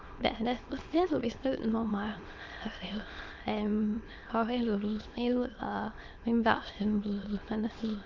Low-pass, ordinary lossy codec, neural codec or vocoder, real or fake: 7.2 kHz; Opus, 32 kbps; autoencoder, 22.05 kHz, a latent of 192 numbers a frame, VITS, trained on many speakers; fake